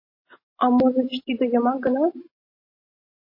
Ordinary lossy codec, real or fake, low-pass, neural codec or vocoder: MP3, 24 kbps; real; 5.4 kHz; none